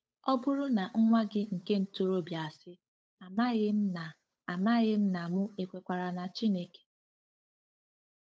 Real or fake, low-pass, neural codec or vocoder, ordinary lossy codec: fake; none; codec, 16 kHz, 8 kbps, FunCodec, trained on Chinese and English, 25 frames a second; none